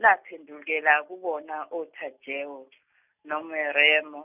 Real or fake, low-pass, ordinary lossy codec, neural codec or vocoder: real; 3.6 kHz; none; none